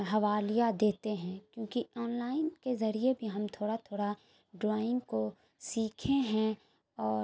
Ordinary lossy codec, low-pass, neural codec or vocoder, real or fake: none; none; none; real